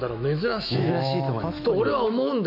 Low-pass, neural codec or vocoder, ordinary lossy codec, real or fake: 5.4 kHz; autoencoder, 48 kHz, 128 numbers a frame, DAC-VAE, trained on Japanese speech; none; fake